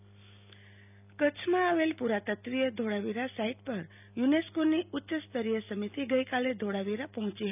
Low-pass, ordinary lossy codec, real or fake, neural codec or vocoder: 3.6 kHz; none; real; none